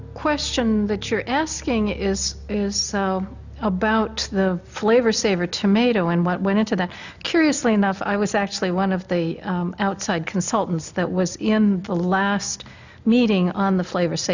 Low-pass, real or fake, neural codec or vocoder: 7.2 kHz; real; none